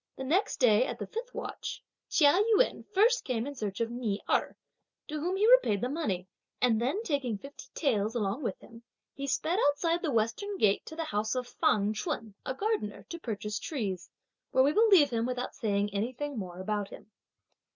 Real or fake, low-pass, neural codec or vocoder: real; 7.2 kHz; none